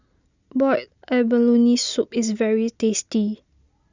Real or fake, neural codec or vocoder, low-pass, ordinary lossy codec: real; none; 7.2 kHz; Opus, 64 kbps